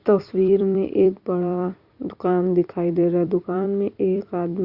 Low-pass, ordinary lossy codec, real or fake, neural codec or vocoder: 5.4 kHz; Opus, 64 kbps; fake; vocoder, 44.1 kHz, 128 mel bands every 256 samples, BigVGAN v2